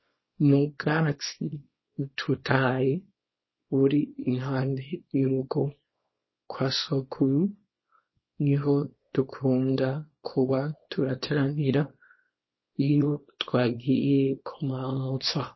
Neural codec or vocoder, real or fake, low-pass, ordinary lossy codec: codec, 24 kHz, 0.9 kbps, WavTokenizer, small release; fake; 7.2 kHz; MP3, 24 kbps